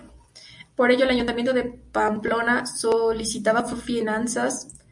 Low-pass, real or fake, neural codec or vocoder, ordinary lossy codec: 10.8 kHz; real; none; MP3, 64 kbps